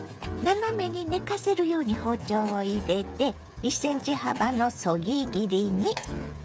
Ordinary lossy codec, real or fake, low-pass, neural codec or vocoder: none; fake; none; codec, 16 kHz, 8 kbps, FreqCodec, smaller model